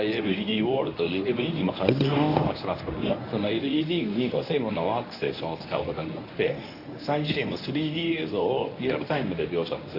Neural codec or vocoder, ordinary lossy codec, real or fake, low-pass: codec, 24 kHz, 0.9 kbps, WavTokenizer, medium speech release version 1; AAC, 32 kbps; fake; 5.4 kHz